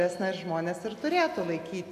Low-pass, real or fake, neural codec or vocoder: 14.4 kHz; real; none